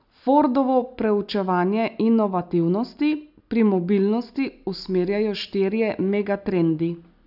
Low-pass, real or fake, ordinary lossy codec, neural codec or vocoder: 5.4 kHz; real; none; none